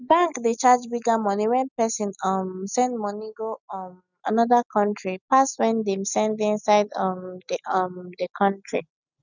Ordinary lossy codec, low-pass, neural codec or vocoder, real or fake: none; 7.2 kHz; none; real